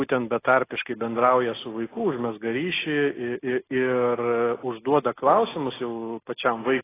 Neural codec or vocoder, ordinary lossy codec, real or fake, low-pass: none; AAC, 16 kbps; real; 3.6 kHz